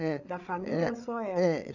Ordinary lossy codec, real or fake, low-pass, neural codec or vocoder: none; fake; 7.2 kHz; codec, 16 kHz, 8 kbps, FreqCodec, larger model